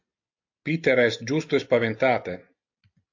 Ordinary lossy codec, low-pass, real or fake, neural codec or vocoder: AAC, 48 kbps; 7.2 kHz; real; none